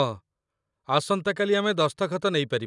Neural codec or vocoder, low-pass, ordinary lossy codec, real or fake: none; 10.8 kHz; none; real